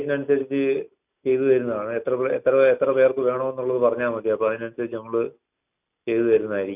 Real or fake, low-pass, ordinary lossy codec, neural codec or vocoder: real; 3.6 kHz; none; none